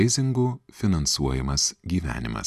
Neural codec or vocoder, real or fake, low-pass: none; real; 14.4 kHz